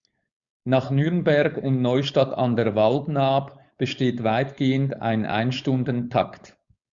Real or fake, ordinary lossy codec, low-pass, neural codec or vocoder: fake; Opus, 64 kbps; 7.2 kHz; codec, 16 kHz, 4.8 kbps, FACodec